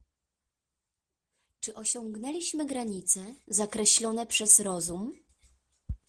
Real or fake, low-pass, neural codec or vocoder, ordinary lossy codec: real; 10.8 kHz; none; Opus, 16 kbps